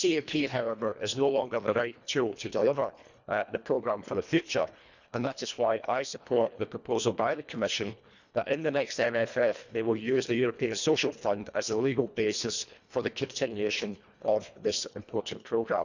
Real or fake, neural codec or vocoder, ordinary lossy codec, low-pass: fake; codec, 24 kHz, 1.5 kbps, HILCodec; none; 7.2 kHz